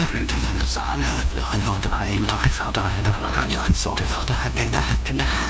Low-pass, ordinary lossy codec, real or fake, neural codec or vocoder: none; none; fake; codec, 16 kHz, 0.5 kbps, FunCodec, trained on LibriTTS, 25 frames a second